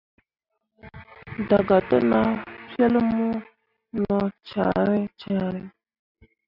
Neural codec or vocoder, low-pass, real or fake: none; 5.4 kHz; real